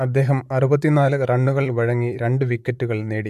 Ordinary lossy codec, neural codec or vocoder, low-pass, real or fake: AAC, 96 kbps; vocoder, 44.1 kHz, 128 mel bands, Pupu-Vocoder; 14.4 kHz; fake